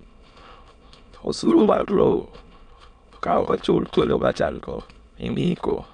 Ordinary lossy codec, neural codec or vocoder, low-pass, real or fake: none; autoencoder, 22.05 kHz, a latent of 192 numbers a frame, VITS, trained on many speakers; 9.9 kHz; fake